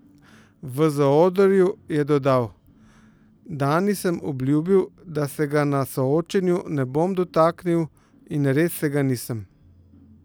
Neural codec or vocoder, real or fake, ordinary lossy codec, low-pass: none; real; none; none